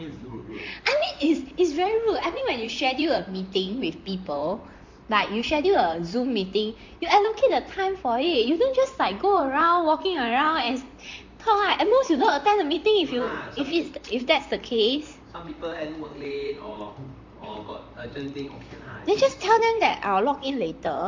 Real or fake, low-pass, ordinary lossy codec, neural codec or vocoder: fake; 7.2 kHz; MP3, 48 kbps; vocoder, 44.1 kHz, 128 mel bands, Pupu-Vocoder